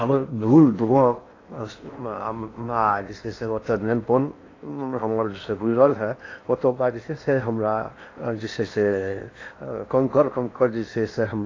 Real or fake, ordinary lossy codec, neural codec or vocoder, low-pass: fake; AAC, 32 kbps; codec, 16 kHz in and 24 kHz out, 0.8 kbps, FocalCodec, streaming, 65536 codes; 7.2 kHz